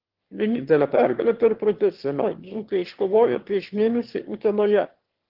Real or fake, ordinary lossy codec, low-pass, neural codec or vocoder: fake; Opus, 16 kbps; 5.4 kHz; autoencoder, 22.05 kHz, a latent of 192 numbers a frame, VITS, trained on one speaker